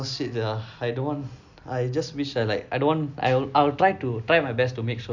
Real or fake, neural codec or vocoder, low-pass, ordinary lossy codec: real; none; 7.2 kHz; none